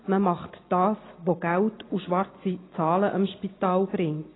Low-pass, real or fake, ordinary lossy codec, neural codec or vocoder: 7.2 kHz; real; AAC, 16 kbps; none